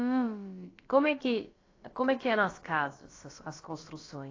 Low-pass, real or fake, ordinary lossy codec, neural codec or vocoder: 7.2 kHz; fake; AAC, 32 kbps; codec, 16 kHz, about 1 kbps, DyCAST, with the encoder's durations